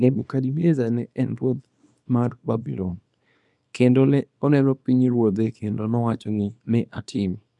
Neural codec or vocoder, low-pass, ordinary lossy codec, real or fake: codec, 24 kHz, 0.9 kbps, WavTokenizer, small release; 10.8 kHz; none; fake